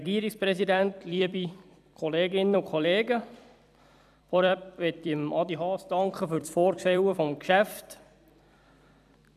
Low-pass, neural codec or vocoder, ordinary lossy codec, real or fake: 14.4 kHz; vocoder, 44.1 kHz, 128 mel bands every 256 samples, BigVGAN v2; none; fake